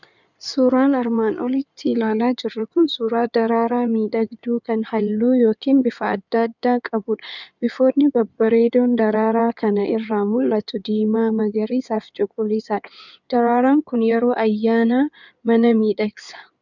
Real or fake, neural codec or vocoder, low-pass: fake; codec, 16 kHz in and 24 kHz out, 2.2 kbps, FireRedTTS-2 codec; 7.2 kHz